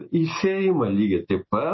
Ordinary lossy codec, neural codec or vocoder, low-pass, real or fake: MP3, 24 kbps; none; 7.2 kHz; real